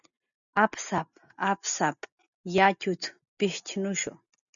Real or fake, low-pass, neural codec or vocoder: real; 7.2 kHz; none